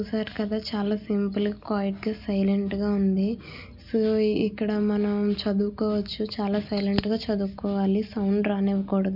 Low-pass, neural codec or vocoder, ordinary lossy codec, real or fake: 5.4 kHz; none; none; real